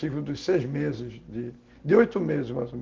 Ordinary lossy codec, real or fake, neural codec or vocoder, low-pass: Opus, 16 kbps; real; none; 7.2 kHz